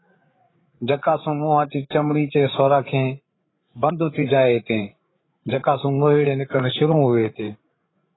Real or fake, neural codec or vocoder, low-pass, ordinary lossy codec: fake; codec, 16 kHz, 8 kbps, FreqCodec, larger model; 7.2 kHz; AAC, 16 kbps